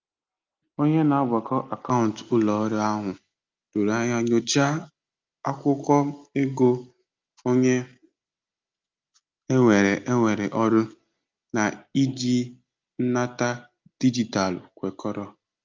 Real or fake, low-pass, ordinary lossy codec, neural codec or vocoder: real; 7.2 kHz; Opus, 24 kbps; none